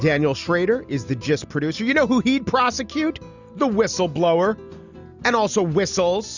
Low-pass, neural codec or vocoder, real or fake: 7.2 kHz; none; real